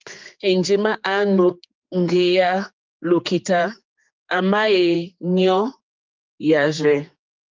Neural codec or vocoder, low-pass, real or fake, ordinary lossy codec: codec, 16 kHz, 4 kbps, X-Codec, HuBERT features, trained on general audio; 7.2 kHz; fake; Opus, 24 kbps